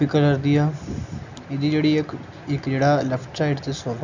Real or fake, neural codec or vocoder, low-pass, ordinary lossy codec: real; none; 7.2 kHz; none